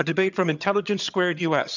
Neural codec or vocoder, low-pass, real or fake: vocoder, 22.05 kHz, 80 mel bands, HiFi-GAN; 7.2 kHz; fake